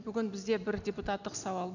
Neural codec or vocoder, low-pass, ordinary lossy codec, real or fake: none; 7.2 kHz; none; real